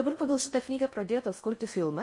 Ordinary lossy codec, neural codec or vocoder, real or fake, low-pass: MP3, 48 kbps; codec, 16 kHz in and 24 kHz out, 0.6 kbps, FocalCodec, streaming, 4096 codes; fake; 10.8 kHz